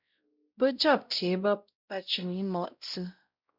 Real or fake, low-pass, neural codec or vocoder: fake; 5.4 kHz; codec, 16 kHz, 0.5 kbps, X-Codec, WavLM features, trained on Multilingual LibriSpeech